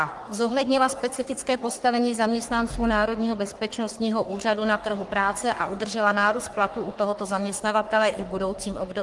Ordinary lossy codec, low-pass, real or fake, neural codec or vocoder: Opus, 24 kbps; 10.8 kHz; fake; codec, 44.1 kHz, 3.4 kbps, Pupu-Codec